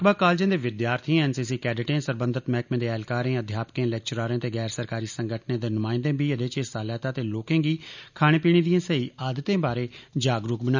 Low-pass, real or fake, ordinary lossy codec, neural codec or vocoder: 7.2 kHz; real; none; none